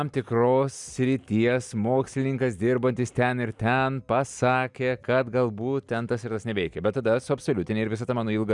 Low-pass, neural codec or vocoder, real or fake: 10.8 kHz; none; real